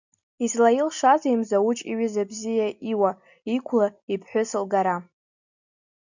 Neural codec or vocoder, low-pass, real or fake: none; 7.2 kHz; real